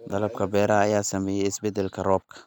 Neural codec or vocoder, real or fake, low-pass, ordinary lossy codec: none; real; 19.8 kHz; none